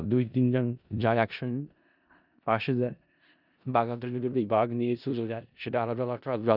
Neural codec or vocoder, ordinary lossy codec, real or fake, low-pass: codec, 16 kHz in and 24 kHz out, 0.4 kbps, LongCat-Audio-Codec, four codebook decoder; none; fake; 5.4 kHz